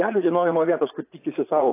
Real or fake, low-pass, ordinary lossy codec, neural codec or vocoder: fake; 3.6 kHz; AAC, 24 kbps; vocoder, 44.1 kHz, 80 mel bands, Vocos